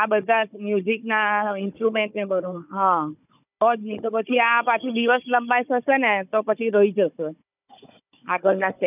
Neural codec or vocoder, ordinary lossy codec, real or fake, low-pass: codec, 16 kHz, 16 kbps, FunCodec, trained on Chinese and English, 50 frames a second; none; fake; 3.6 kHz